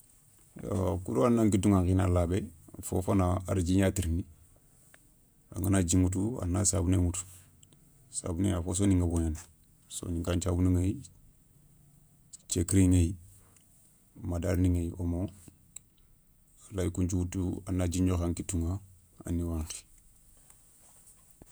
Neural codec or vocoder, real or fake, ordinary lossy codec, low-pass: vocoder, 48 kHz, 128 mel bands, Vocos; fake; none; none